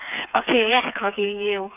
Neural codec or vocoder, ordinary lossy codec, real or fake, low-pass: codec, 16 kHz, 4 kbps, FreqCodec, smaller model; none; fake; 3.6 kHz